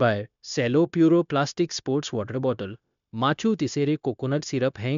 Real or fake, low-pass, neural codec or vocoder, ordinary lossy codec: fake; 7.2 kHz; codec, 16 kHz, 0.9 kbps, LongCat-Audio-Codec; MP3, 64 kbps